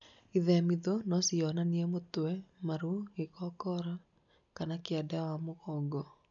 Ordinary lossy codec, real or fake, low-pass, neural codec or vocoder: none; real; 7.2 kHz; none